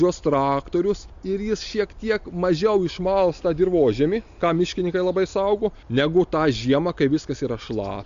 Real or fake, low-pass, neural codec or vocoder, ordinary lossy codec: real; 7.2 kHz; none; MP3, 64 kbps